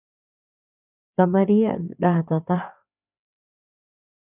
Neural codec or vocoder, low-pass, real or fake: codec, 16 kHz, 4 kbps, FreqCodec, larger model; 3.6 kHz; fake